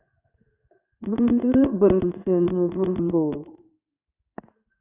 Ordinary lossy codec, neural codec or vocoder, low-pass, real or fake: Opus, 64 kbps; codec, 16 kHz in and 24 kHz out, 1 kbps, XY-Tokenizer; 3.6 kHz; fake